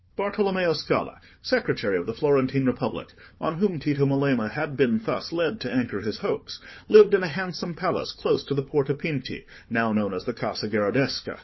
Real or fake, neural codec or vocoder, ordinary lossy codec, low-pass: fake; codec, 16 kHz, 4 kbps, FunCodec, trained on Chinese and English, 50 frames a second; MP3, 24 kbps; 7.2 kHz